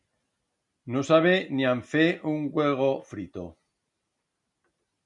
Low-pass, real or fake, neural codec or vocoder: 10.8 kHz; real; none